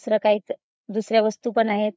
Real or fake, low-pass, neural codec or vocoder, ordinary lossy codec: fake; none; codec, 16 kHz, 4 kbps, FreqCodec, larger model; none